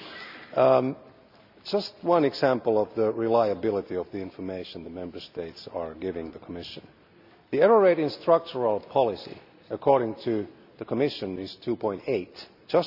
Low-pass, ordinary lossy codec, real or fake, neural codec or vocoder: 5.4 kHz; none; real; none